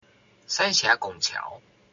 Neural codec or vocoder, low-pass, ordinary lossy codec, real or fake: none; 7.2 kHz; AAC, 64 kbps; real